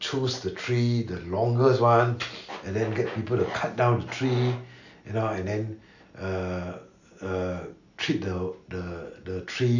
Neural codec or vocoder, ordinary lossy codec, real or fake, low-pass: none; none; real; 7.2 kHz